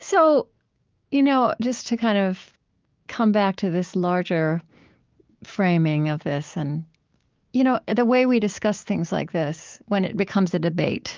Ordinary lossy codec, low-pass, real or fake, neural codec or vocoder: Opus, 32 kbps; 7.2 kHz; fake; codec, 16 kHz, 6 kbps, DAC